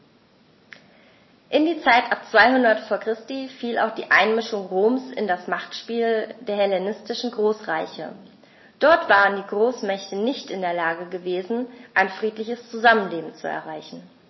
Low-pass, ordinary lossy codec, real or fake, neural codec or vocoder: 7.2 kHz; MP3, 24 kbps; real; none